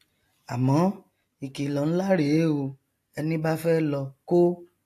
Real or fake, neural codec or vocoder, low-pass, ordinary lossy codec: real; none; 14.4 kHz; AAC, 64 kbps